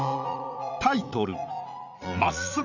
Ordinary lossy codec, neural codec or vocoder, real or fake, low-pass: none; vocoder, 44.1 kHz, 80 mel bands, Vocos; fake; 7.2 kHz